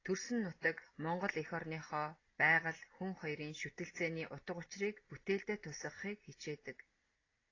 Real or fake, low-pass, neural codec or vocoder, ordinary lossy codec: real; 7.2 kHz; none; AAC, 32 kbps